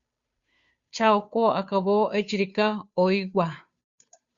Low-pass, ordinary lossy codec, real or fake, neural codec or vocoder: 7.2 kHz; Opus, 64 kbps; fake; codec, 16 kHz, 2 kbps, FunCodec, trained on Chinese and English, 25 frames a second